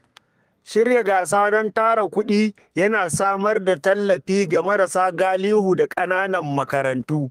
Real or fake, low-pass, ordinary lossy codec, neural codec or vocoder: fake; 14.4 kHz; Opus, 32 kbps; codec, 32 kHz, 1.9 kbps, SNAC